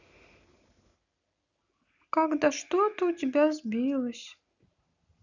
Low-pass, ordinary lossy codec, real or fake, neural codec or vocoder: 7.2 kHz; none; real; none